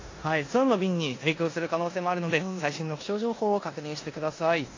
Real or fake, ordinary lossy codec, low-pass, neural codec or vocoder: fake; AAC, 32 kbps; 7.2 kHz; codec, 16 kHz in and 24 kHz out, 0.9 kbps, LongCat-Audio-Codec, four codebook decoder